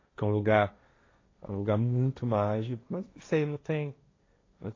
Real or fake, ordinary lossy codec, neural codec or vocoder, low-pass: fake; AAC, 32 kbps; codec, 16 kHz, 1.1 kbps, Voila-Tokenizer; 7.2 kHz